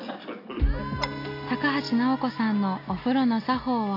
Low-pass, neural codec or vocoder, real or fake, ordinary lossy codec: 5.4 kHz; none; real; AAC, 32 kbps